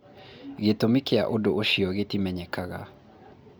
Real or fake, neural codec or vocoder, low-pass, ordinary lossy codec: real; none; none; none